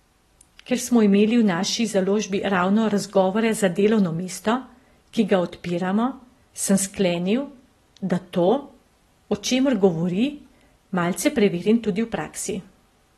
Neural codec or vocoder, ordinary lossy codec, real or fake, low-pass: none; AAC, 32 kbps; real; 14.4 kHz